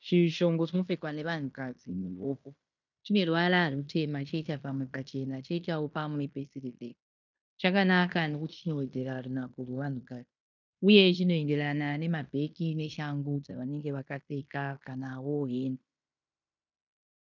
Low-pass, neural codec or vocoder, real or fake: 7.2 kHz; codec, 16 kHz in and 24 kHz out, 0.9 kbps, LongCat-Audio-Codec, fine tuned four codebook decoder; fake